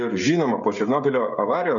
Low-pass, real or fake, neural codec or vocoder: 7.2 kHz; fake; codec, 16 kHz, 16 kbps, FreqCodec, smaller model